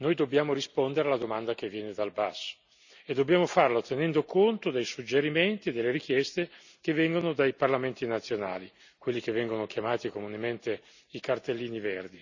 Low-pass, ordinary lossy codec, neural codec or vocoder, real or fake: 7.2 kHz; none; none; real